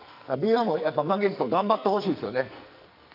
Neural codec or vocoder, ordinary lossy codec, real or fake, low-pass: codec, 44.1 kHz, 2.6 kbps, SNAC; none; fake; 5.4 kHz